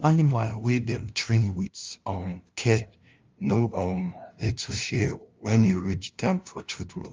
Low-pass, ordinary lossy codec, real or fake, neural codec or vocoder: 7.2 kHz; Opus, 32 kbps; fake; codec, 16 kHz, 0.5 kbps, FunCodec, trained on LibriTTS, 25 frames a second